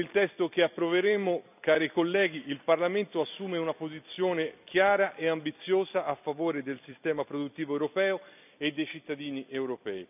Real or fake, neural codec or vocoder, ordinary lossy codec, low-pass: fake; vocoder, 44.1 kHz, 128 mel bands every 256 samples, BigVGAN v2; none; 3.6 kHz